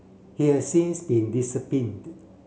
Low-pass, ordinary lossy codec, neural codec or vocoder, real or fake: none; none; none; real